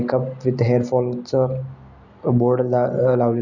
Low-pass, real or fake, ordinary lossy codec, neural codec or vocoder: 7.2 kHz; real; none; none